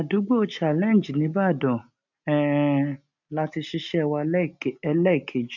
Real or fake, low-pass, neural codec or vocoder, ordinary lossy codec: real; 7.2 kHz; none; none